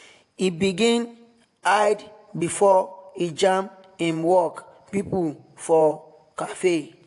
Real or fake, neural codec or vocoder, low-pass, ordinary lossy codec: fake; vocoder, 24 kHz, 100 mel bands, Vocos; 10.8 kHz; AAC, 64 kbps